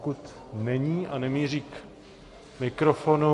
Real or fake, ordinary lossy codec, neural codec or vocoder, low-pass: real; MP3, 48 kbps; none; 14.4 kHz